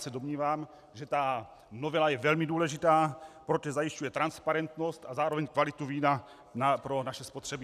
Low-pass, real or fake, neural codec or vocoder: 14.4 kHz; real; none